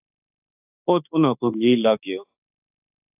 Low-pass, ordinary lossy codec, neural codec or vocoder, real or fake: 3.6 kHz; AAC, 32 kbps; autoencoder, 48 kHz, 32 numbers a frame, DAC-VAE, trained on Japanese speech; fake